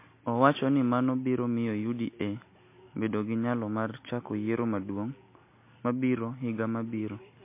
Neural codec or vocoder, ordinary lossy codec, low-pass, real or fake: none; MP3, 24 kbps; 3.6 kHz; real